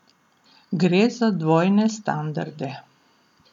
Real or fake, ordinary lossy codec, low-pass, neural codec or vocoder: real; none; 19.8 kHz; none